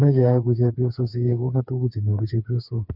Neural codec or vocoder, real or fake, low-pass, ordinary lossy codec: codec, 16 kHz, 4 kbps, FreqCodec, smaller model; fake; 5.4 kHz; none